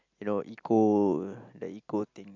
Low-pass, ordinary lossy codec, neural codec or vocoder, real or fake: 7.2 kHz; MP3, 64 kbps; none; real